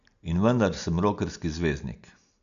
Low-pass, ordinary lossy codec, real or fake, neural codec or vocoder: 7.2 kHz; none; real; none